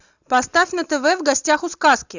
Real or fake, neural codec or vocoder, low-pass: real; none; 7.2 kHz